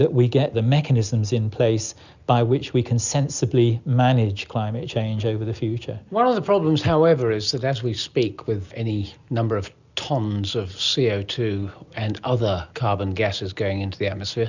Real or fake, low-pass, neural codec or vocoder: real; 7.2 kHz; none